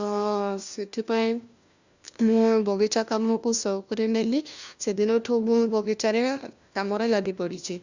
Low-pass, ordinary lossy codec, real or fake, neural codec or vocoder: 7.2 kHz; Opus, 64 kbps; fake; codec, 16 kHz, 1 kbps, FunCodec, trained on LibriTTS, 50 frames a second